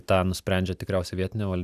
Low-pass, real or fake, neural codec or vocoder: 14.4 kHz; real; none